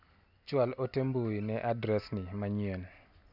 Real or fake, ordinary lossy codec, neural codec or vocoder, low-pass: real; none; none; 5.4 kHz